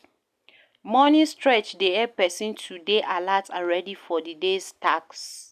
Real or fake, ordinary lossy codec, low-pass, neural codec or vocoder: real; none; 14.4 kHz; none